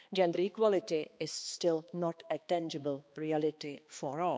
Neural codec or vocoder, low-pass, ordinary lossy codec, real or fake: codec, 16 kHz, 2 kbps, X-Codec, HuBERT features, trained on balanced general audio; none; none; fake